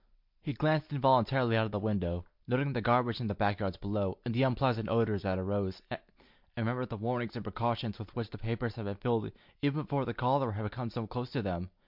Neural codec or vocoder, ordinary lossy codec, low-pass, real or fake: none; MP3, 48 kbps; 5.4 kHz; real